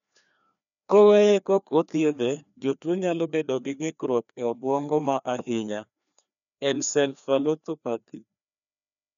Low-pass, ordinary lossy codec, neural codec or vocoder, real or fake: 7.2 kHz; none; codec, 16 kHz, 2 kbps, FreqCodec, larger model; fake